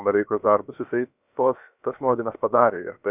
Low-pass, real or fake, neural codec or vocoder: 3.6 kHz; fake; codec, 16 kHz, about 1 kbps, DyCAST, with the encoder's durations